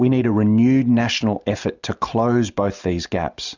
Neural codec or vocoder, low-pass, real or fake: none; 7.2 kHz; real